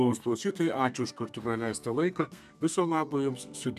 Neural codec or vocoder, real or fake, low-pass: codec, 32 kHz, 1.9 kbps, SNAC; fake; 14.4 kHz